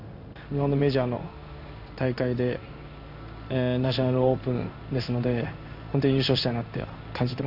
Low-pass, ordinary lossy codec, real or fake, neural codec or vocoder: 5.4 kHz; none; fake; codec, 16 kHz in and 24 kHz out, 1 kbps, XY-Tokenizer